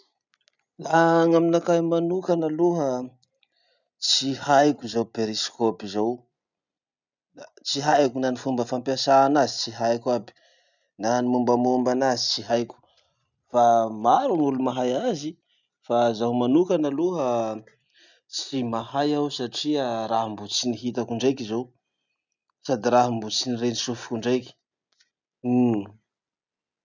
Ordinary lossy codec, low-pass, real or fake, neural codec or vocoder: none; 7.2 kHz; real; none